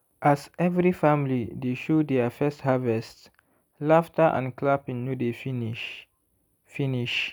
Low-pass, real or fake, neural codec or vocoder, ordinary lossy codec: none; real; none; none